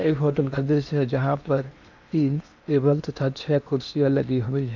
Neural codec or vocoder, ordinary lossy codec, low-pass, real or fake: codec, 16 kHz in and 24 kHz out, 0.8 kbps, FocalCodec, streaming, 65536 codes; none; 7.2 kHz; fake